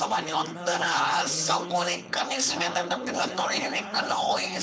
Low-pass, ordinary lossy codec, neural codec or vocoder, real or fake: none; none; codec, 16 kHz, 4.8 kbps, FACodec; fake